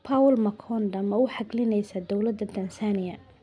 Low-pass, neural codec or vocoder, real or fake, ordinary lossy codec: 9.9 kHz; none; real; none